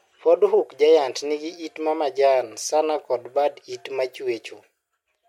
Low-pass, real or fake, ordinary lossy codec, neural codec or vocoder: 19.8 kHz; real; MP3, 64 kbps; none